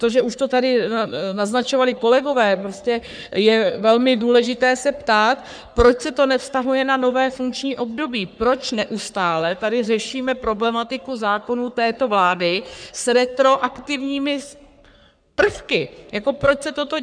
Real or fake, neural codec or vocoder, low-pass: fake; codec, 44.1 kHz, 3.4 kbps, Pupu-Codec; 9.9 kHz